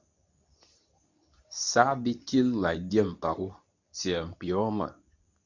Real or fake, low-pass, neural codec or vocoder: fake; 7.2 kHz; codec, 24 kHz, 0.9 kbps, WavTokenizer, medium speech release version 2